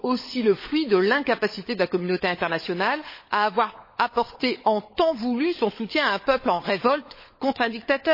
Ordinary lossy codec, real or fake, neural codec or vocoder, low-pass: MP3, 24 kbps; fake; codec, 16 kHz, 16 kbps, FunCodec, trained on LibriTTS, 50 frames a second; 5.4 kHz